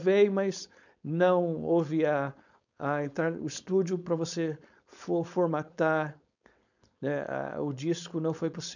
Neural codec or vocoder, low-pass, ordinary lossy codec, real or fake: codec, 16 kHz, 4.8 kbps, FACodec; 7.2 kHz; none; fake